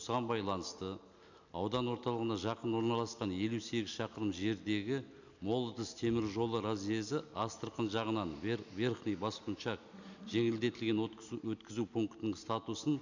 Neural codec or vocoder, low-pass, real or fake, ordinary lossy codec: none; 7.2 kHz; real; none